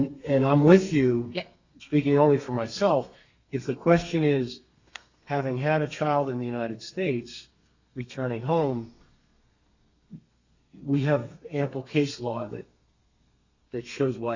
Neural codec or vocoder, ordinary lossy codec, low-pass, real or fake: codec, 32 kHz, 1.9 kbps, SNAC; Opus, 64 kbps; 7.2 kHz; fake